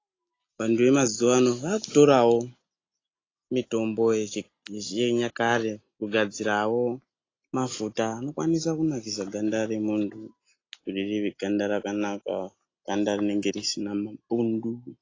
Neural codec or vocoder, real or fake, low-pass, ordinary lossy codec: none; real; 7.2 kHz; AAC, 32 kbps